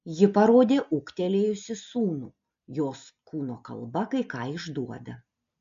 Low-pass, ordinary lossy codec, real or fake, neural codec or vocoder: 7.2 kHz; MP3, 48 kbps; real; none